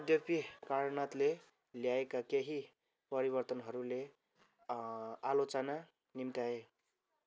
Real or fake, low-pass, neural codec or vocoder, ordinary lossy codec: real; none; none; none